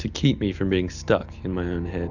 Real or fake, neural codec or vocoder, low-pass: real; none; 7.2 kHz